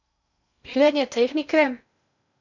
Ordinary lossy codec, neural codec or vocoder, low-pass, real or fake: none; codec, 16 kHz in and 24 kHz out, 0.6 kbps, FocalCodec, streaming, 2048 codes; 7.2 kHz; fake